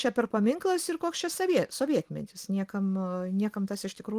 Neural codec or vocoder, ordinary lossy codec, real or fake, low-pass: none; Opus, 24 kbps; real; 14.4 kHz